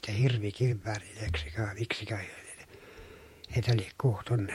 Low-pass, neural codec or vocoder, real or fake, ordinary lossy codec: 19.8 kHz; vocoder, 48 kHz, 128 mel bands, Vocos; fake; MP3, 64 kbps